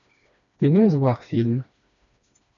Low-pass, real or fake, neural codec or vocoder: 7.2 kHz; fake; codec, 16 kHz, 2 kbps, FreqCodec, smaller model